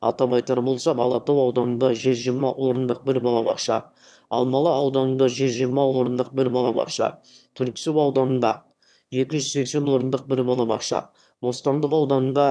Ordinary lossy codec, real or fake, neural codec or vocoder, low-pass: none; fake; autoencoder, 22.05 kHz, a latent of 192 numbers a frame, VITS, trained on one speaker; none